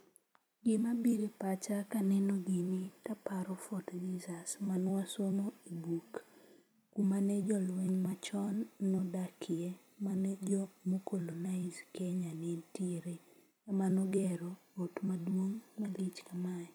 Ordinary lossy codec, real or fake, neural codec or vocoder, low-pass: none; fake; vocoder, 44.1 kHz, 128 mel bands every 256 samples, BigVGAN v2; none